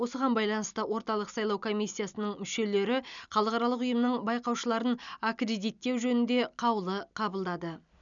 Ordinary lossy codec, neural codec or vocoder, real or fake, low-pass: none; none; real; 7.2 kHz